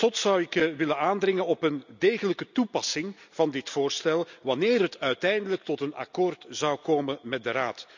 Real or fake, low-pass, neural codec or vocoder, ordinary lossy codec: real; 7.2 kHz; none; none